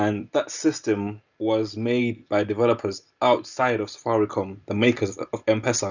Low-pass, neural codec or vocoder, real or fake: 7.2 kHz; none; real